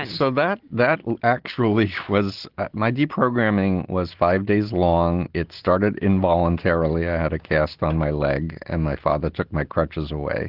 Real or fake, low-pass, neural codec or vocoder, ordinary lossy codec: real; 5.4 kHz; none; Opus, 16 kbps